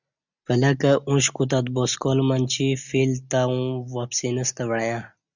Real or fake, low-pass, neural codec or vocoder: real; 7.2 kHz; none